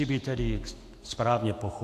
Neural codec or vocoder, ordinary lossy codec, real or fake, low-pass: autoencoder, 48 kHz, 128 numbers a frame, DAC-VAE, trained on Japanese speech; AAC, 64 kbps; fake; 14.4 kHz